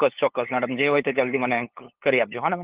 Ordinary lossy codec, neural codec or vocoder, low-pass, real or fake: Opus, 16 kbps; codec, 16 kHz, 8 kbps, FunCodec, trained on LibriTTS, 25 frames a second; 3.6 kHz; fake